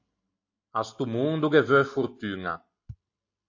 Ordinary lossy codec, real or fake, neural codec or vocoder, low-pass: AAC, 48 kbps; real; none; 7.2 kHz